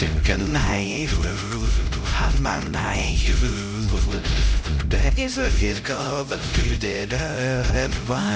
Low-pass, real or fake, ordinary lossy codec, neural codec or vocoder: none; fake; none; codec, 16 kHz, 0.5 kbps, X-Codec, HuBERT features, trained on LibriSpeech